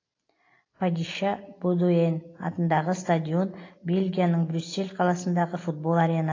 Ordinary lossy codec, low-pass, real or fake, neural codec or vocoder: AAC, 32 kbps; 7.2 kHz; real; none